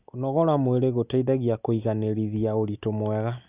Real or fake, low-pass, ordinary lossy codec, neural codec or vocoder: real; 3.6 kHz; Opus, 64 kbps; none